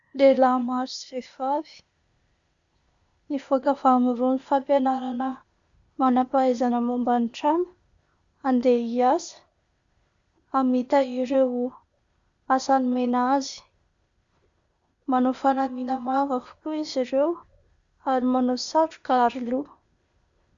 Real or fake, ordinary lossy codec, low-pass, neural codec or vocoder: fake; Opus, 64 kbps; 7.2 kHz; codec, 16 kHz, 0.8 kbps, ZipCodec